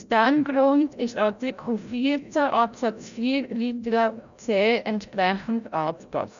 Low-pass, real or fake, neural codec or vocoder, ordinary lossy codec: 7.2 kHz; fake; codec, 16 kHz, 0.5 kbps, FreqCodec, larger model; none